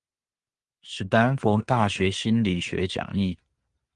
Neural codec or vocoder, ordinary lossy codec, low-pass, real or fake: none; Opus, 32 kbps; 10.8 kHz; real